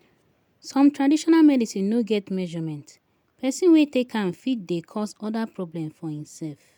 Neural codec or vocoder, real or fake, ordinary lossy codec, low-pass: none; real; none; 19.8 kHz